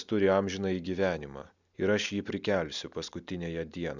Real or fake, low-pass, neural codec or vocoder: real; 7.2 kHz; none